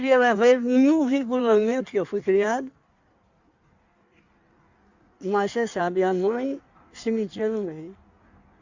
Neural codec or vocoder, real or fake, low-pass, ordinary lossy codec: codec, 16 kHz in and 24 kHz out, 1.1 kbps, FireRedTTS-2 codec; fake; 7.2 kHz; Opus, 64 kbps